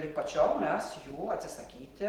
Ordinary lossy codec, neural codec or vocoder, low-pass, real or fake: Opus, 16 kbps; none; 19.8 kHz; real